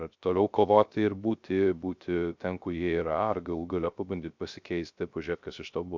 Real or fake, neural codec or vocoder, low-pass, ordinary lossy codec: fake; codec, 16 kHz, 0.3 kbps, FocalCodec; 7.2 kHz; MP3, 64 kbps